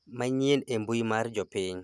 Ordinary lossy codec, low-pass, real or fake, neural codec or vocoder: none; none; real; none